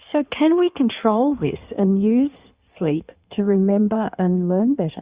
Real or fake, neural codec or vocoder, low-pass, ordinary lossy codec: fake; codec, 16 kHz in and 24 kHz out, 1.1 kbps, FireRedTTS-2 codec; 3.6 kHz; Opus, 64 kbps